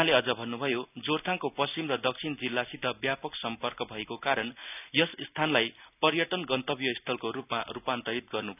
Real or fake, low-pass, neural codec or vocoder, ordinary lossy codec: real; 3.6 kHz; none; none